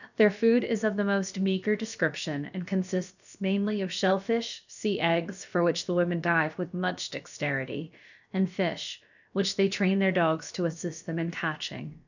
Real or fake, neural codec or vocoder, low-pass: fake; codec, 16 kHz, about 1 kbps, DyCAST, with the encoder's durations; 7.2 kHz